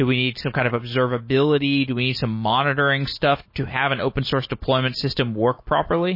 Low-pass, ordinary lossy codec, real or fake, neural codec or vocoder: 5.4 kHz; MP3, 24 kbps; real; none